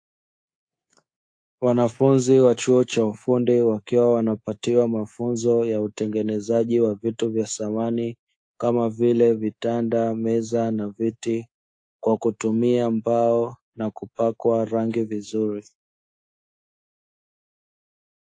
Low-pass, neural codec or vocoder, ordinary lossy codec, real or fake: 9.9 kHz; codec, 24 kHz, 3.1 kbps, DualCodec; AAC, 48 kbps; fake